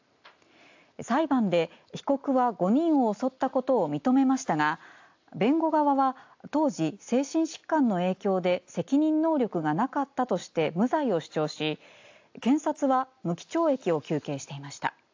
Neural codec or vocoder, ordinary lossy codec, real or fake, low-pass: none; AAC, 48 kbps; real; 7.2 kHz